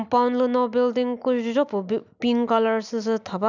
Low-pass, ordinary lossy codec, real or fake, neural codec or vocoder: 7.2 kHz; none; real; none